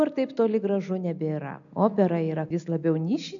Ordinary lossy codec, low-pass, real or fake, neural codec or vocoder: AAC, 64 kbps; 7.2 kHz; real; none